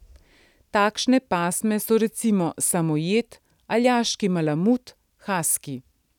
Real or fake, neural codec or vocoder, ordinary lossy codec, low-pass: real; none; none; 19.8 kHz